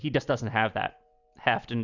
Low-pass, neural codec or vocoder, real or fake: 7.2 kHz; none; real